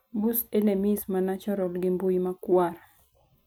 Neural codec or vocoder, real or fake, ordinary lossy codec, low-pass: none; real; none; none